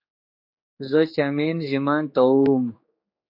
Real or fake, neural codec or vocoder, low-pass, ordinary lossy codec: fake; codec, 16 kHz, 4 kbps, X-Codec, HuBERT features, trained on general audio; 5.4 kHz; MP3, 32 kbps